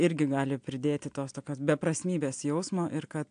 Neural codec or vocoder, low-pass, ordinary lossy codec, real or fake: none; 9.9 kHz; AAC, 64 kbps; real